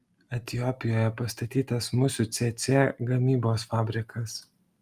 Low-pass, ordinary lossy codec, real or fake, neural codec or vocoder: 14.4 kHz; Opus, 32 kbps; real; none